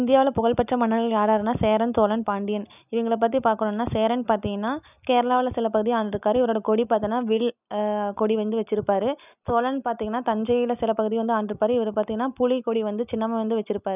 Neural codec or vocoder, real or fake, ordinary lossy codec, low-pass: none; real; none; 3.6 kHz